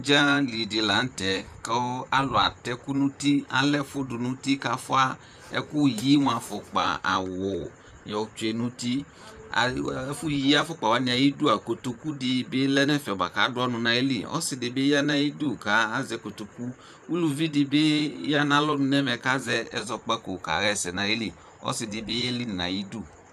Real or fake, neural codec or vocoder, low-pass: fake; vocoder, 44.1 kHz, 128 mel bands, Pupu-Vocoder; 14.4 kHz